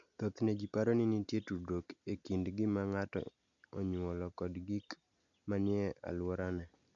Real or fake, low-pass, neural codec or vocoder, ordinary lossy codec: real; 7.2 kHz; none; none